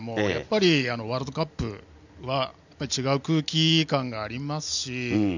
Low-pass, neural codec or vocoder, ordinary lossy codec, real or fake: 7.2 kHz; none; none; real